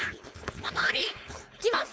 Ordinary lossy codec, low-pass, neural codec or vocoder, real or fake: none; none; codec, 16 kHz, 4.8 kbps, FACodec; fake